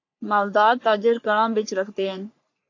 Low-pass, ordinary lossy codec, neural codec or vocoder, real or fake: 7.2 kHz; AAC, 48 kbps; codec, 44.1 kHz, 3.4 kbps, Pupu-Codec; fake